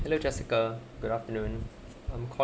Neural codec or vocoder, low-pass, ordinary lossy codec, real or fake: none; none; none; real